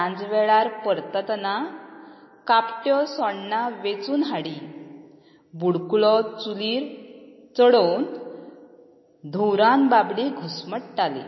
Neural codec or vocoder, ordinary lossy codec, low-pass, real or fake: none; MP3, 24 kbps; 7.2 kHz; real